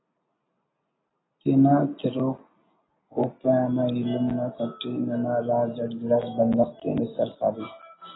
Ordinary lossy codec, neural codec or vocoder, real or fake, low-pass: AAC, 16 kbps; none; real; 7.2 kHz